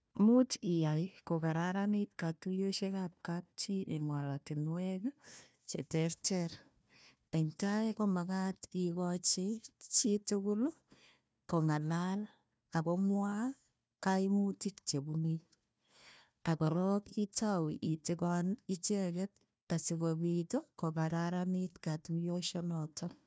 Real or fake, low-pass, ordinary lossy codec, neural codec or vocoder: fake; none; none; codec, 16 kHz, 1 kbps, FunCodec, trained on Chinese and English, 50 frames a second